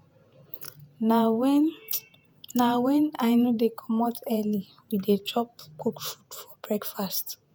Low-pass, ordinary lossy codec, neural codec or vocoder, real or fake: none; none; vocoder, 48 kHz, 128 mel bands, Vocos; fake